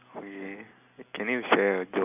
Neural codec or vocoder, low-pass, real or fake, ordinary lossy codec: none; 3.6 kHz; real; none